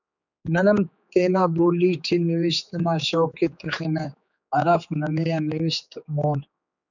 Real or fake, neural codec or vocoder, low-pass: fake; codec, 16 kHz, 4 kbps, X-Codec, HuBERT features, trained on general audio; 7.2 kHz